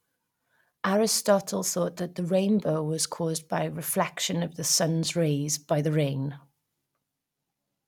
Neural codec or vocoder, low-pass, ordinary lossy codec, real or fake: none; 19.8 kHz; none; real